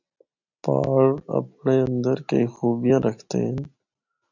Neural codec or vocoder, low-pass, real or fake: none; 7.2 kHz; real